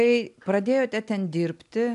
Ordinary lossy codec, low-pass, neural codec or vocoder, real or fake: MP3, 96 kbps; 10.8 kHz; none; real